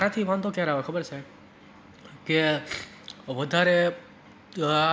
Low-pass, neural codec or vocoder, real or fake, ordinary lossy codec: none; none; real; none